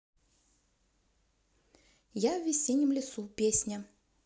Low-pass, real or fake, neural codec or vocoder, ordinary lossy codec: none; real; none; none